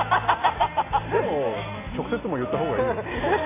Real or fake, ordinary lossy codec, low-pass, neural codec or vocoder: real; AAC, 16 kbps; 3.6 kHz; none